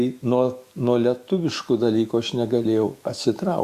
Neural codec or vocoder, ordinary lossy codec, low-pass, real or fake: autoencoder, 48 kHz, 128 numbers a frame, DAC-VAE, trained on Japanese speech; Opus, 64 kbps; 14.4 kHz; fake